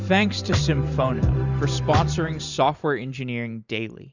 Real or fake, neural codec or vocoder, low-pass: fake; vocoder, 44.1 kHz, 128 mel bands every 512 samples, BigVGAN v2; 7.2 kHz